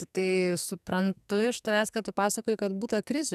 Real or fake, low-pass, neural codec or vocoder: fake; 14.4 kHz; codec, 44.1 kHz, 2.6 kbps, SNAC